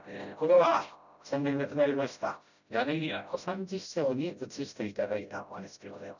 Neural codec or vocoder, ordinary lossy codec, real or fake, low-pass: codec, 16 kHz, 0.5 kbps, FreqCodec, smaller model; none; fake; 7.2 kHz